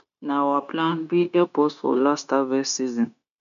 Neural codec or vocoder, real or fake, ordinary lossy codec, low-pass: codec, 16 kHz, 0.9 kbps, LongCat-Audio-Codec; fake; none; 7.2 kHz